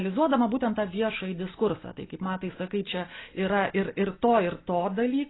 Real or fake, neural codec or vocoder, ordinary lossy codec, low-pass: real; none; AAC, 16 kbps; 7.2 kHz